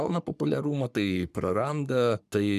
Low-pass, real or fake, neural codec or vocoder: 14.4 kHz; fake; codec, 44.1 kHz, 3.4 kbps, Pupu-Codec